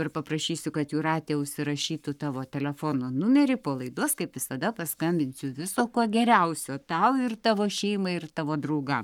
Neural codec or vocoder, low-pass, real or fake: codec, 44.1 kHz, 7.8 kbps, Pupu-Codec; 19.8 kHz; fake